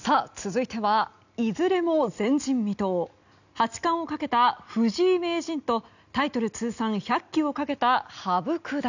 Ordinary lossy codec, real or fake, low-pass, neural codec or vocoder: none; real; 7.2 kHz; none